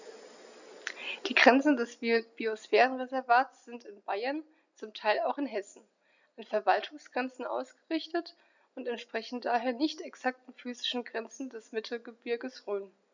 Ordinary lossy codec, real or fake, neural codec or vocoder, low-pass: none; real; none; 7.2 kHz